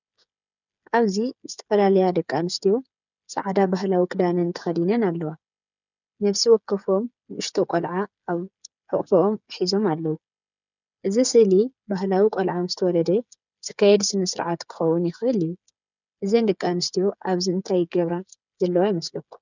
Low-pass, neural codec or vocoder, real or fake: 7.2 kHz; codec, 16 kHz, 8 kbps, FreqCodec, smaller model; fake